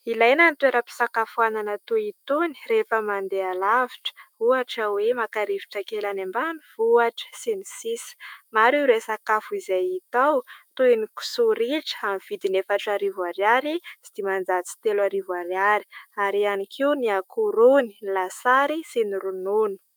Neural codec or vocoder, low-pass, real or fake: autoencoder, 48 kHz, 128 numbers a frame, DAC-VAE, trained on Japanese speech; 19.8 kHz; fake